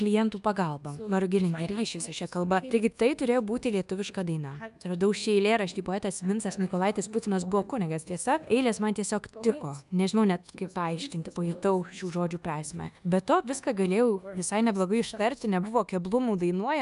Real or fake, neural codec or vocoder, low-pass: fake; codec, 24 kHz, 1.2 kbps, DualCodec; 10.8 kHz